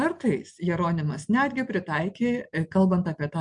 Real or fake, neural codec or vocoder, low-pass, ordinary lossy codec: real; none; 9.9 kHz; MP3, 64 kbps